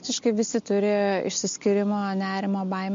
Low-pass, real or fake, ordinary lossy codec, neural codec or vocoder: 7.2 kHz; real; MP3, 48 kbps; none